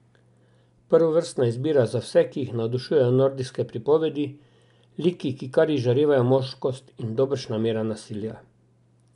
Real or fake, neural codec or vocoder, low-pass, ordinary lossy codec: real; none; 10.8 kHz; none